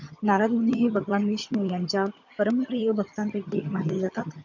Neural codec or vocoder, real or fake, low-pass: vocoder, 22.05 kHz, 80 mel bands, HiFi-GAN; fake; 7.2 kHz